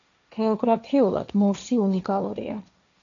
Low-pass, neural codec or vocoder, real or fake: 7.2 kHz; codec, 16 kHz, 1.1 kbps, Voila-Tokenizer; fake